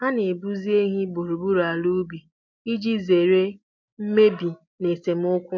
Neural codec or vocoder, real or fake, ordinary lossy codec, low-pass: none; real; MP3, 64 kbps; 7.2 kHz